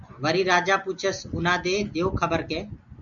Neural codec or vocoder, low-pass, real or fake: none; 7.2 kHz; real